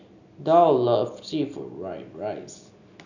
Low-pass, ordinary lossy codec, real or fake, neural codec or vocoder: 7.2 kHz; none; real; none